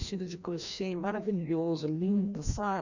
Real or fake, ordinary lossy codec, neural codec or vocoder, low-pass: fake; none; codec, 16 kHz, 1 kbps, FreqCodec, larger model; 7.2 kHz